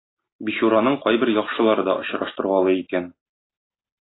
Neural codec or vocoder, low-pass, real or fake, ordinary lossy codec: none; 7.2 kHz; real; AAC, 16 kbps